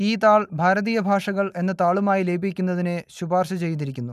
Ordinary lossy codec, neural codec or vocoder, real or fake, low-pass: none; none; real; 14.4 kHz